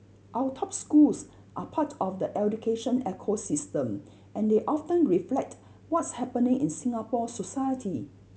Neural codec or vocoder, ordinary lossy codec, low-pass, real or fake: none; none; none; real